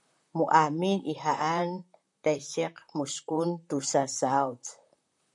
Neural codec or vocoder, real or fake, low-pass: vocoder, 44.1 kHz, 128 mel bands, Pupu-Vocoder; fake; 10.8 kHz